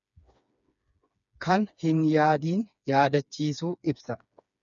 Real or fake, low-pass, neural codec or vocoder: fake; 7.2 kHz; codec, 16 kHz, 4 kbps, FreqCodec, smaller model